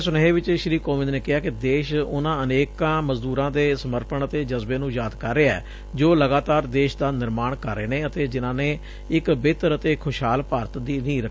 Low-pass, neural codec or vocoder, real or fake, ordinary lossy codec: none; none; real; none